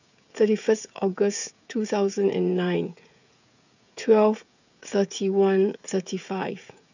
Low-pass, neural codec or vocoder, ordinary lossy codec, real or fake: 7.2 kHz; codec, 16 kHz, 16 kbps, FreqCodec, smaller model; none; fake